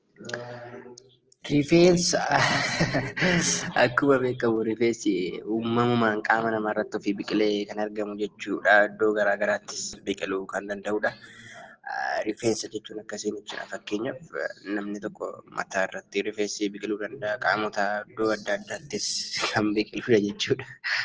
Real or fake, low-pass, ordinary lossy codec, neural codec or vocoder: real; 7.2 kHz; Opus, 16 kbps; none